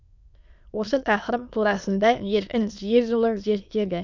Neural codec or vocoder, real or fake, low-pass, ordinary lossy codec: autoencoder, 22.05 kHz, a latent of 192 numbers a frame, VITS, trained on many speakers; fake; 7.2 kHz; none